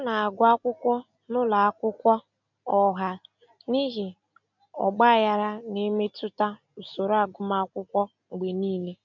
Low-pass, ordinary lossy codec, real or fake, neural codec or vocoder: 7.2 kHz; none; real; none